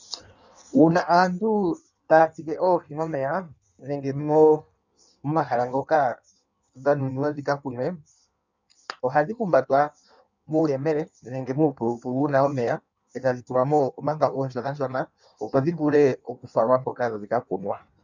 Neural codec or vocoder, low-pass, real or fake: codec, 16 kHz in and 24 kHz out, 1.1 kbps, FireRedTTS-2 codec; 7.2 kHz; fake